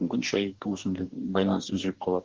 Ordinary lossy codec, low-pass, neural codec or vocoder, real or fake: Opus, 16 kbps; 7.2 kHz; codec, 44.1 kHz, 2.6 kbps, DAC; fake